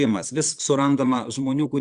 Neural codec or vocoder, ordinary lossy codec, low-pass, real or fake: vocoder, 22.05 kHz, 80 mel bands, Vocos; Opus, 64 kbps; 9.9 kHz; fake